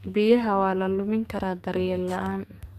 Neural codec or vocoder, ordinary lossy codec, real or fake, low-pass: codec, 44.1 kHz, 2.6 kbps, SNAC; none; fake; 14.4 kHz